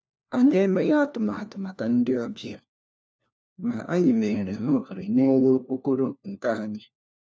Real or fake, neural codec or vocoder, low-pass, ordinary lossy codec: fake; codec, 16 kHz, 1 kbps, FunCodec, trained on LibriTTS, 50 frames a second; none; none